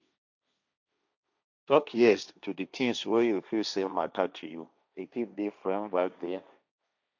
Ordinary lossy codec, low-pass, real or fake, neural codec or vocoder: none; 7.2 kHz; fake; codec, 16 kHz, 1.1 kbps, Voila-Tokenizer